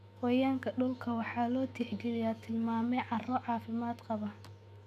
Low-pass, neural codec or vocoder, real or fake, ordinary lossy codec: 14.4 kHz; autoencoder, 48 kHz, 128 numbers a frame, DAC-VAE, trained on Japanese speech; fake; none